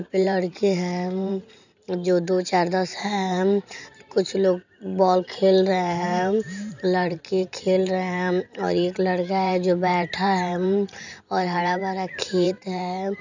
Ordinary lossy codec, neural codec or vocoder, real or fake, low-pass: none; vocoder, 44.1 kHz, 128 mel bands every 512 samples, BigVGAN v2; fake; 7.2 kHz